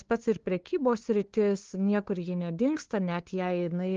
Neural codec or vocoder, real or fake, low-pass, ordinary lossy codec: codec, 16 kHz, 2 kbps, FunCodec, trained on LibriTTS, 25 frames a second; fake; 7.2 kHz; Opus, 16 kbps